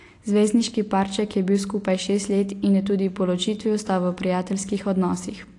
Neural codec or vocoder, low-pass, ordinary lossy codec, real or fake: none; 10.8 kHz; AAC, 48 kbps; real